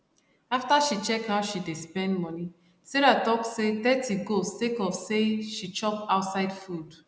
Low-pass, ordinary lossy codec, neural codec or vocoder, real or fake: none; none; none; real